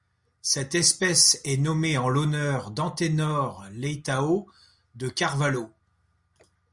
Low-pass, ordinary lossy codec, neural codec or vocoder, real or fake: 10.8 kHz; Opus, 64 kbps; none; real